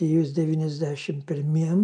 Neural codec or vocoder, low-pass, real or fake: none; 9.9 kHz; real